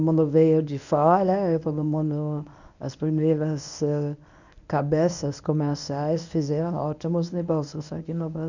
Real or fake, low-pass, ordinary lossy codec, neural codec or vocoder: fake; 7.2 kHz; none; codec, 24 kHz, 0.9 kbps, WavTokenizer, medium speech release version 1